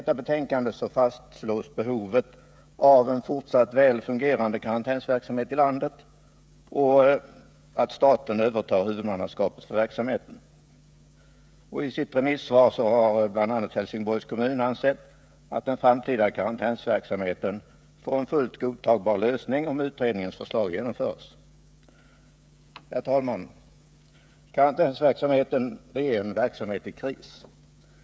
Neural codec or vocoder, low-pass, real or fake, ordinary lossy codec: codec, 16 kHz, 16 kbps, FreqCodec, smaller model; none; fake; none